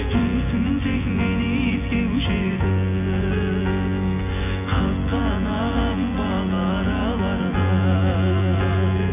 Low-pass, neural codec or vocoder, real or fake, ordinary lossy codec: 3.6 kHz; vocoder, 24 kHz, 100 mel bands, Vocos; fake; none